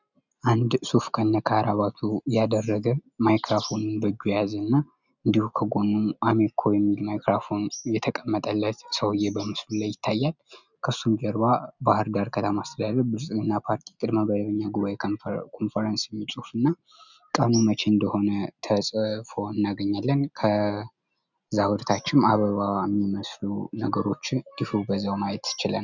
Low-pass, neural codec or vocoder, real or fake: 7.2 kHz; none; real